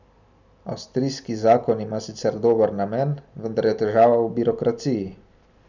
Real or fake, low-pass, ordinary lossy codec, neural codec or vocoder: real; 7.2 kHz; none; none